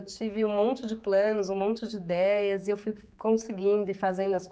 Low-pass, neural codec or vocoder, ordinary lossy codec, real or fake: none; codec, 16 kHz, 4 kbps, X-Codec, HuBERT features, trained on general audio; none; fake